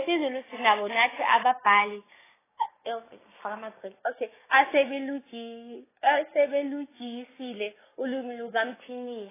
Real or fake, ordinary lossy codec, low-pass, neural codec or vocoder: fake; AAC, 16 kbps; 3.6 kHz; codec, 16 kHz, 6 kbps, DAC